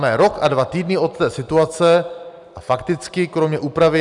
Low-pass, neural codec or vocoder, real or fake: 10.8 kHz; none; real